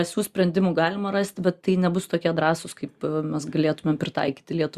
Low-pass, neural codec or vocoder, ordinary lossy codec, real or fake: 14.4 kHz; none; Opus, 64 kbps; real